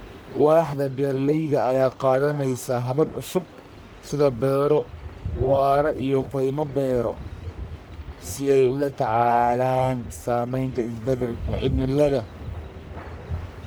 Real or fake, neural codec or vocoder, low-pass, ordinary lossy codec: fake; codec, 44.1 kHz, 1.7 kbps, Pupu-Codec; none; none